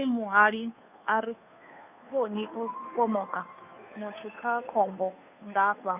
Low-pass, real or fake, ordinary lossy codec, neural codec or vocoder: 3.6 kHz; fake; none; codec, 16 kHz, 2 kbps, FunCodec, trained on Chinese and English, 25 frames a second